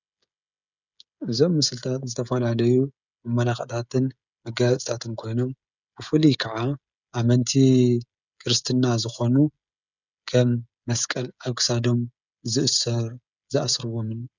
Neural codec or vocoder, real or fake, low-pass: codec, 16 kHz, 16 kbps, FreqCodec, smaller model; fake; 7.2 kHz